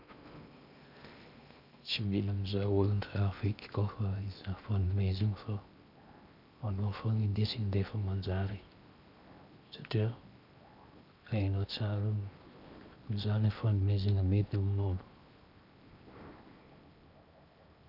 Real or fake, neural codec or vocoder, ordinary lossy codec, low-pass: fake; codec, 16 kHz in and 24 kHz out, 0.8 kbps, FocalCodec, streaming, 65536 codes; none; 5.4 kHz